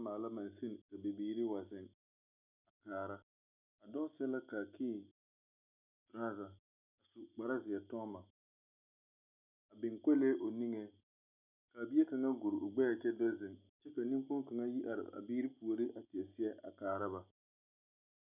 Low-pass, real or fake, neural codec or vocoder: 3.6 kHz; real; none